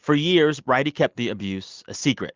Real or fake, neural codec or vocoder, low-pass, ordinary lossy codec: real; none; 7.2 kHz; Opus, 32 kbps